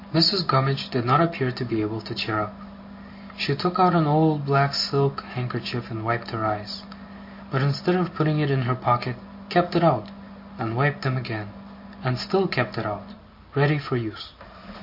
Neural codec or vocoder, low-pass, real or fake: none; 5.4 kHz; real